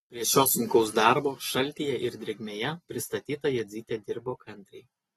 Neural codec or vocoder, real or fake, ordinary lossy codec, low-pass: none; real; AAC, 32 kbps; 19.8 kHz